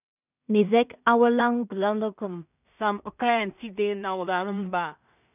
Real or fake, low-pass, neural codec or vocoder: fake; 3.6 kHz; codec, 16 kHz in and 24 kHz out, 0.4 kbps, LongCat-Audio-Codec, two codebook decoder